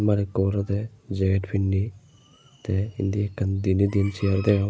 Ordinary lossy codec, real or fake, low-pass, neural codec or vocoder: none; real; none; none